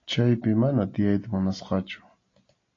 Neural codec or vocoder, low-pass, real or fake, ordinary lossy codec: none; 7.2 kHz; real; AAC, 32 kbps